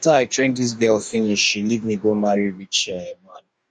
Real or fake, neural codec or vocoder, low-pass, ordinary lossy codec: fake; codec, 44.1 kHz, 2.6 kbps, DAC; 9.9 kHz; none